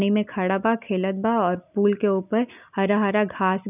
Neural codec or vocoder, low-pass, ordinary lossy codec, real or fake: none; 3.6 kHz; none; real